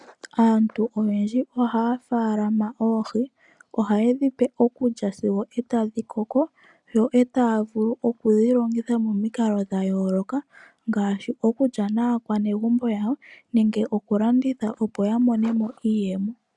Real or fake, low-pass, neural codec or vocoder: real; 10.8 kHz; none